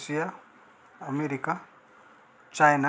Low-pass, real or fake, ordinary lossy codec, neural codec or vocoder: none; real; none; none